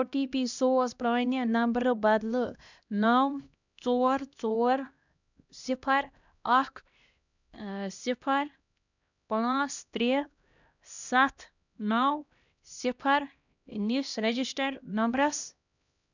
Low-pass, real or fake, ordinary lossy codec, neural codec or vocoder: 7.2 kHz; fake; none; codec, 16 kHz, 1 kbps, X-Codec, HuBERT features, trained on LibriSpeech